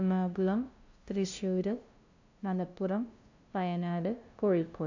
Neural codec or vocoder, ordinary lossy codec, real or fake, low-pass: codec, 16 kHz, 0.5 kbps, FunCodec, trained on LibriTTS, 25 frames a second; AAC, 48 kbps; fake; 7.2 kHz